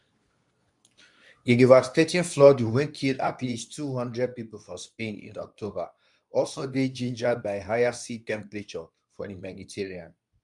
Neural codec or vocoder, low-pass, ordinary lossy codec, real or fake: codec, 24 kHz, 0.9 kbps, WavTokenizer, medium speech release version 2; 10.8 kHz; none; fake